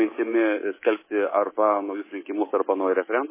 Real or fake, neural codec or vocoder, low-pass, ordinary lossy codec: fake; codec, 24 kHz, 3.1 kbps, DualCodec; 3.6 kHz; MP3, 16 kbps